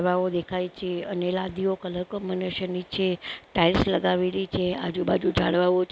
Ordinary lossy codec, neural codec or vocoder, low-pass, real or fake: none; none; none; real